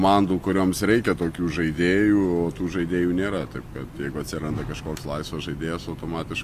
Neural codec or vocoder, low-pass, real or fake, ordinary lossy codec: none; 14.4 kHz; real; Opus, 64 kbps